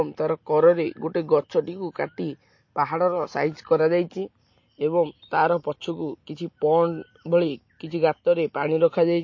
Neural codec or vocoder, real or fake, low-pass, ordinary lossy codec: none; real; 7.2 kHz; MP3, 32 kbps